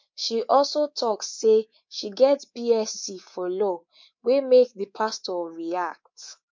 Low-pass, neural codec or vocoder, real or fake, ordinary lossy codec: 7.2 kHz; codec, 24 kHz, 3.1 kbps, DualCodec; fake; MP3, 48 kbps